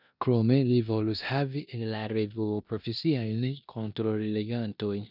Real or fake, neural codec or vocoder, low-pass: fake; codec, 16 kHz in and 24 kHz out, 0.9 kbps, LongCat-Audio-Codec, four codebook decoder; 5.4 kHz